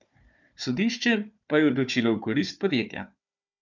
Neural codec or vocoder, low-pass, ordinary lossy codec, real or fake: codec, 16 kHz, 4 kbps, FunCodec, trained on Chinese and English, 50 frames a second; 7.2 kHz; none; fake